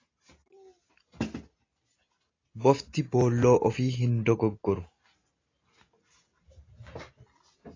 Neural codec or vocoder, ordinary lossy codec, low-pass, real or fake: none; AAC, 32 kbps; 7.2 kHz; real